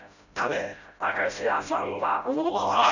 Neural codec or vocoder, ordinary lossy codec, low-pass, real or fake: codec, 16 kHz, 0.5 kbps, FreqCodec, smaller model; none; 7.2 kHz; fake